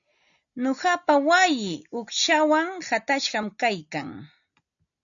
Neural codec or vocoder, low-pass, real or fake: none; 7.2 kHz; real